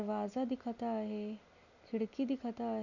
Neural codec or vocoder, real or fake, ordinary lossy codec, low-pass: none; real; none; 7.2 kHz